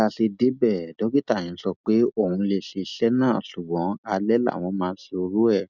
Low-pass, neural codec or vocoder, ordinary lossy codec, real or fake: 7.2 kHz; none; none; real